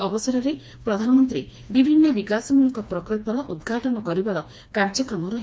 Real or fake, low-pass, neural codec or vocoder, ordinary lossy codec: fake; none; codec, 16 kHz, 2 kbps, FreqCodec, smaller model; none